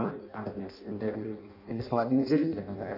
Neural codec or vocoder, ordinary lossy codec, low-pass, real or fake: codec, 16 kHz in and 24 kHz out, 0.6 kbps, FireRedTTS-2 codec; none; 5.4 kHz; fake